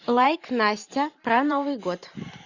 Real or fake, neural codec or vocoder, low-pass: fake; vocoder, 44.1 kHz, 128 mel bands every 512 samples, BigVGAN v2; 7.2 kHz